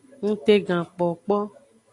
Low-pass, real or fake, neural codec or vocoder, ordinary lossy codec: 10.8 kHz; real; none; AAC, 48 kbps